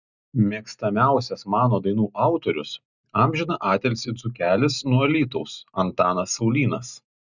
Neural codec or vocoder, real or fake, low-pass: none; real; 7.2 kHz